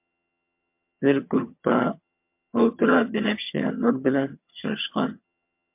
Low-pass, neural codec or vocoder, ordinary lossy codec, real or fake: 3.6 kHz; vocoder, 22.05 kHz, 80 mel bands, HiFi-GAN; MP3, 32 kbps; fake